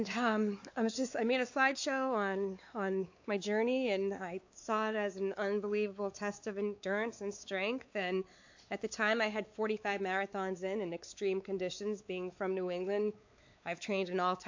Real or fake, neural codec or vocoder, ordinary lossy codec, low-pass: fake; codec, 16 kHz, 4 kbps, X-Codec, WavLM features, trained on Multilingual LibriSpeech; AAC, 48 kbps; 7.2 kHz